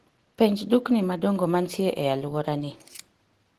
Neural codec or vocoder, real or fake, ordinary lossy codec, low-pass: none; real; Opus, 16 kbps; 14.4 kHz